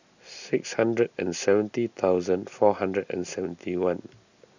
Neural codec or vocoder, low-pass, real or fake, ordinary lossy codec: none; 7.2 kHz; real; none